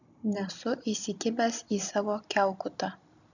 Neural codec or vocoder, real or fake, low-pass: none; real; 7.2 kHz